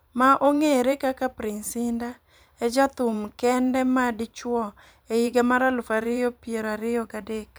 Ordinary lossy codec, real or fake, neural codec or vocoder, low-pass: none; fake; vocoder, 44.1 kHz, 128 mel bands every 512 samples, BigVGAN v2; none